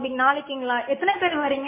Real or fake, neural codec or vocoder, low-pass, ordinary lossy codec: real; none; 3.6 kHz; MP3, 16 kbps